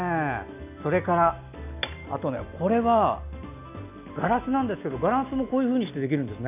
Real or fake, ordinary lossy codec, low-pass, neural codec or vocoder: real; none; 3.6 kHz; none